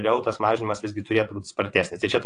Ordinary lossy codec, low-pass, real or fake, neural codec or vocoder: AAC, 48 kbps; 9.9 kHz; fake; vocoder, 22.05 kHz, 80 mel bands, WaveNeXt